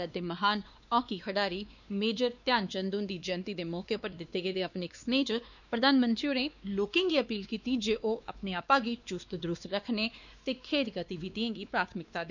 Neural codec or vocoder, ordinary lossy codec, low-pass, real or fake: codec, 16 kHz, 2 kbps, X-Codec, WavLM features, trained on Multilingual LibriSpeech; none; 7.2 kHz; fake